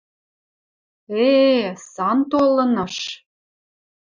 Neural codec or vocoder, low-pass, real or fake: none; 7.2 kHz; real